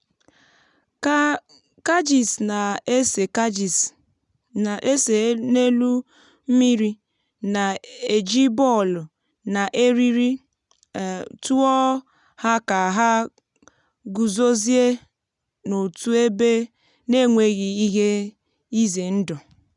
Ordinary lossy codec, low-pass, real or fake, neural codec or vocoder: none; 10.8 kHz; real; none